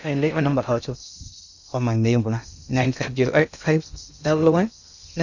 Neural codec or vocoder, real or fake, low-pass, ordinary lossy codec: codec, 16 kHz in and 24 kHz out, 0.6 kbps, FocalCodec, streaming, 2048 codes; fake; 7.2 kHz; none